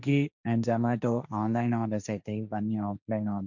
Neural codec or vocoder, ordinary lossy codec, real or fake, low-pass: codec, 16 kHz, 1.1 kbps, Voila-Tokenizer; none; fake; 7.2 kHz